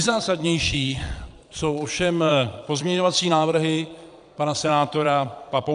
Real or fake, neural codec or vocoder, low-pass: fake; vocoder, 22.05 kHz, 80 mel bands, Vocos; 9.9 kHz